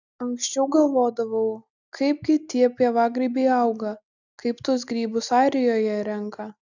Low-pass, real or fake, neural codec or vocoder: 7.2 kHz; real; none